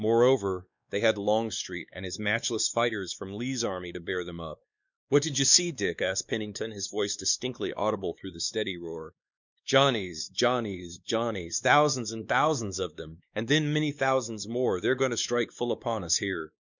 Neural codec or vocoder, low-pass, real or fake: codec, 16 kHz, 2 kbps, X-Codec, WavLM features, trained on Multilingual LibriSpeech; 7.2 kHz; fake